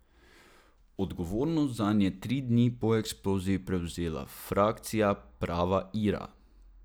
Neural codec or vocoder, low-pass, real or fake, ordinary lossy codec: none; none; real; none